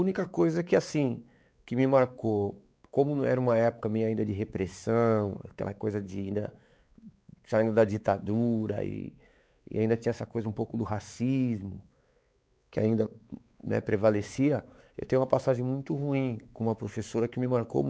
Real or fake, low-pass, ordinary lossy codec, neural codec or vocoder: fake; none; none; codec, 16 kHz, 4 kbps, X-Codec, WavLM features, trained on Multilingual LibriSpeech